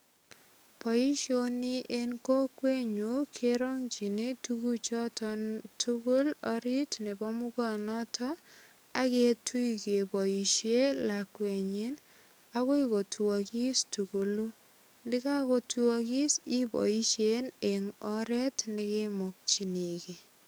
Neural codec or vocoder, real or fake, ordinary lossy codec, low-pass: codec, 44.1 kHz, 7.8 kbps, DAC; fake; none; none